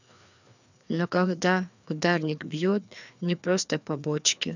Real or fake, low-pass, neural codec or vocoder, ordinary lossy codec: fake; 7.2 kHz; codec, 16 kHz, 2 kbps, FreqCodec, larger model; none